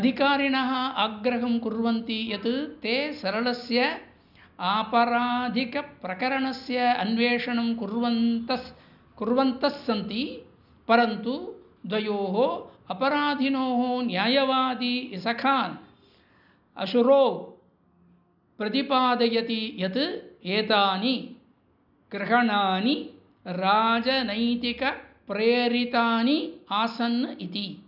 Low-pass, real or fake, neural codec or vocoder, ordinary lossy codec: 5.4 kHz; real; none; none